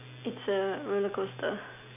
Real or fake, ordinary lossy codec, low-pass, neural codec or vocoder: real; none; 3.6 kHz; none